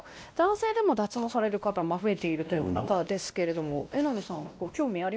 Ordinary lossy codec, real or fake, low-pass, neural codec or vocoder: none; fake; none; codec, 16 kHz, 1 kbps, X-Codec, WavLM features, trained on Multilingual LibriSpeech